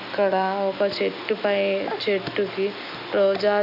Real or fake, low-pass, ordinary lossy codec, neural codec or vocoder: real; 5.4 kHz; none; none